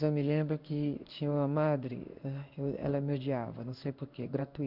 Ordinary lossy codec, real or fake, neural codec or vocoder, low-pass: none; fake; codec, 16 kHz in and 24 kHz out, 1 kbps, XY-Tokenizer; 5.4 kHz